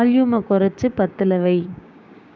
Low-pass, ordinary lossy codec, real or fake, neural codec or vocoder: none; none; fake; codec, 16 kHz, 6 kbps, DAC